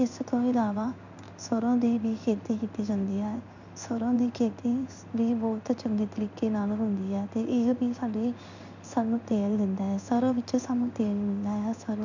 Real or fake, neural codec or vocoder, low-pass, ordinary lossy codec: fake; codec, 16 kHz in and 24 kHz out, 1 kbps, XY-Tokenizer; 7.2 kHz; none